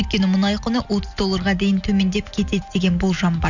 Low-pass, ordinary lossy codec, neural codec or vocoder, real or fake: 7.2 kHz; none; none; real